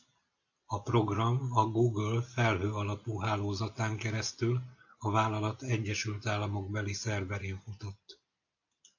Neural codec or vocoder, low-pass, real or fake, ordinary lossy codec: none; 7.2 kHz; real; AAC, 48 kbps